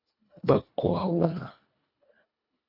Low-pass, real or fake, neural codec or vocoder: 5.4 kHz; fake; codec, 24 kHz, 1.5 kbps, HILCodec